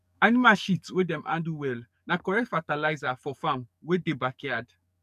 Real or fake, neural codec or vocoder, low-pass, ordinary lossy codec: fake; codec, 44.1 kHz, 7.8 kbps, DAC; 14.4 kHz; none